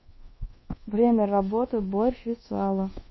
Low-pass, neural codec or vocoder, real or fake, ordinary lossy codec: 7.2 kHz; codec, 24 kHz, 1.2 kbps, DualCodec; fake; MP3, 24 kbps